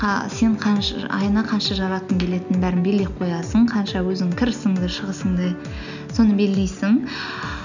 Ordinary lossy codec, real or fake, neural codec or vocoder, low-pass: none; real; none; 7.2 kHz